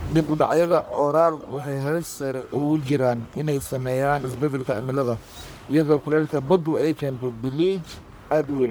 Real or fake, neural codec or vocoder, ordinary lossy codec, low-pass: fake; codec, 44.1 kHz, 1.7 kbps, Pupu-Codec; none; none